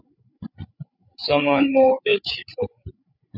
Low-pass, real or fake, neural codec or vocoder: 5.4 kHz; fake; codec, 16 kHz, 8 kbps, FreqCodec, larger model